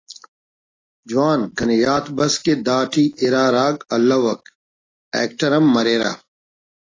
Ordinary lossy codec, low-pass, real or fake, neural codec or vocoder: AAC, 32 kbps; 7.2 kHz; real; none